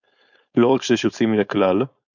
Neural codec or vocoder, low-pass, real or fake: codec, 16 kHz, 4.8 kbps, FACodec; 7.2 kHz; fake